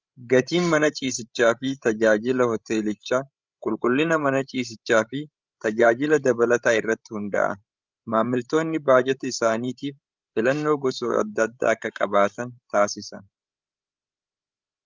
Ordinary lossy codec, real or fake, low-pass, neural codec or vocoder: Opus, 32 kbps; fake; 7.2 kHz; codec, 16 kHz, 16 kbps, FreqCodec, larger model